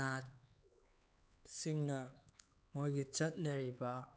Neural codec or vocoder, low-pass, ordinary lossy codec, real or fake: codec, 16 kHz, 4 kbps, X-Codec, HuBERT features, trained on LibriSpeech; none; none; fake